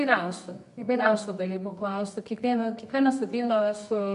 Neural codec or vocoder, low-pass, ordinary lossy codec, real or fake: codec, 24 kHz, 0.9 kbps, WavTokenizer, medium music audio release; 10.8 kHz; MP3, 64 kbps; fake